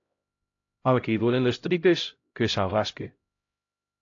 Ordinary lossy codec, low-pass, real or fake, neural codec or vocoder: AAC, 48 kbps; 7.2 kHz; fake; codec, 16 kHz, 0.5 kbps, X-Codec, HuBERT features, trained on LibriSpeech